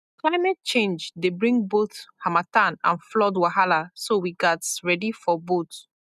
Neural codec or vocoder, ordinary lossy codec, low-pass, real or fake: none; none; 14.4 kHz; real